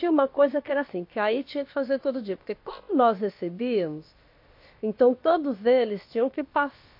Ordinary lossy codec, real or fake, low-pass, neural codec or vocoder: MP3, 32 kbps; fake; 5.4 kHz; codec, 16 kHz, about 1 kbps, DyCAST, with the encoder's durations